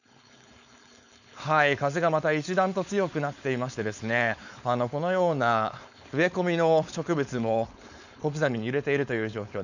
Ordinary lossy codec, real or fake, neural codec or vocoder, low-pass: none; fake; codec, 16 kHz, 4.8 kbps, FACodec; 7.2 kHz